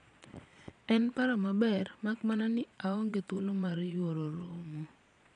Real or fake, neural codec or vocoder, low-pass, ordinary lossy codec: fake; vocoder, 22.05 kHz, 80 mel bands, Vocos; 9.9 kHz; none